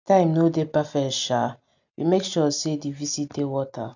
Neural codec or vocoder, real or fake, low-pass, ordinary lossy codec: none; real; 7.2 kHz; none